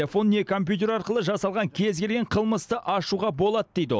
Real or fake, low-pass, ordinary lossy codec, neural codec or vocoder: real; none; none; none